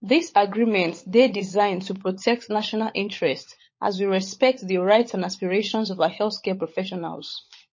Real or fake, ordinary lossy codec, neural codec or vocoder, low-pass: fake; MP3, 32 kbps; codec, 16 kHz, 16 kbps, FunCodec, trained on LibriTTS, 50 frames a second; 7.2 kHz